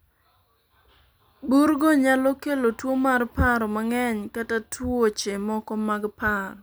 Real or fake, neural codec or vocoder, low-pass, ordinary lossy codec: real; none; none; none